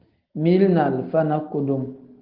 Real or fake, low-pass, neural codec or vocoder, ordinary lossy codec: real; 5.4 kHz; none; Opus, 16 kbps